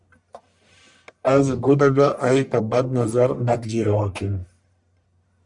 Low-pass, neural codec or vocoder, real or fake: 10.8 kHz; codec, 44.1 kHz, 1.7 kbps, Pupu-Codec; fake